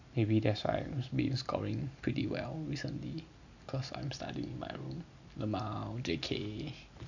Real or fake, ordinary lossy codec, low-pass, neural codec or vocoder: real; none; 7.2 kHz; none